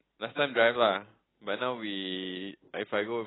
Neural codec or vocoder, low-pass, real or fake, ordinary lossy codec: none; 7.2 kHz; real; AAC, 16 kbps